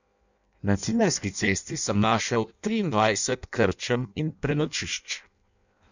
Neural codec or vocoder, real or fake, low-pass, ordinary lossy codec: codec, 16 kHz in and 24 kHz out, 0.6 kbps, FireRedTTS-2 codec; fake; 7.2 kHz; none